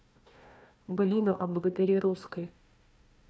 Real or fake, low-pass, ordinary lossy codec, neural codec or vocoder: fake; none; none; codec, 16 kHz, 1 kbps, FunCodec, trained on Chinese and English, 50 frames a second